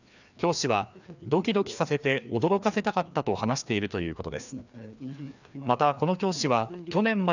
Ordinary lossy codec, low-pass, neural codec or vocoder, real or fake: none; 7.2 kHz; codec, 16 kHz, 2 kbps, FreqCodec, larger model; fake